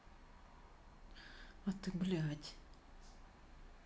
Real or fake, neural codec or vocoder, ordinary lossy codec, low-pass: real; none; none; none